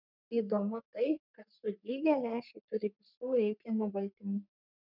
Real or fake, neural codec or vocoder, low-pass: fake; codec, 44.1 kHz, 3.4 kbps, Pupu-Codec; 5.4 kHz